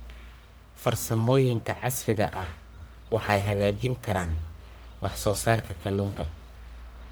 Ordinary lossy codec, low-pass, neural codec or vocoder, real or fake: none; none; codec, 44.1 kHz, 1.7 kbps, Pupu-Codec; fake